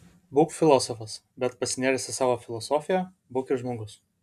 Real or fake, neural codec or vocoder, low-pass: real; none; 14.4 kHz